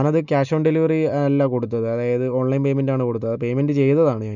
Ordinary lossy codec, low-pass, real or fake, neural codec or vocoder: none; 7.2 kHz; real; none